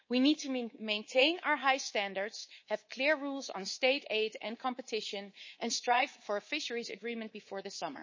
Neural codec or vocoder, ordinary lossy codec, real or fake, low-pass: codec, 24 kHz, 3.1 kbps, DualCodec; MP3, 32 kbps; fake; 7.2 kHz